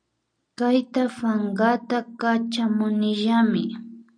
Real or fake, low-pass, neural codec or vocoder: real; 9.9 kHz; none